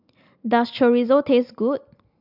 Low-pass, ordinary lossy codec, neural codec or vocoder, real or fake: 5.4 kHz; AAC, 48 kbps; none; real